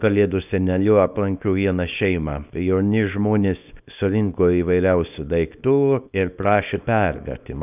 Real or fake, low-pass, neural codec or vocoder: fake; 3.6 kHz; codec, 24 kHz, 0.9 kbps, WavTokenizer, small release